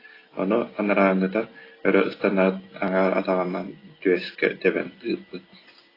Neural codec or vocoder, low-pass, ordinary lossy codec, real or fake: none; 5.4 kHz; AAC, 24 kbps; real